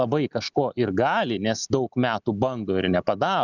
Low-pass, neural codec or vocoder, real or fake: 7.2 kHz; none; real